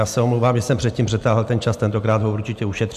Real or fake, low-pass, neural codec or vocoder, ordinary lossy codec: real; 14.4 kHz; none; MP3, 96 kbps